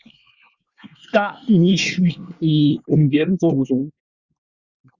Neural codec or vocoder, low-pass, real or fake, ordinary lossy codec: codec, 24 kHz, 1 kbps, SNAC; 7.2 kHz; fake; Opus, 64 kbps